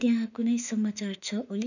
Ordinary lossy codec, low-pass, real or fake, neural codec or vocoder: none; 7.2 kHz; fake; autoencoder, 48 kHz, 32 numbers a frame, DAC-VAE, trained on Japanese speech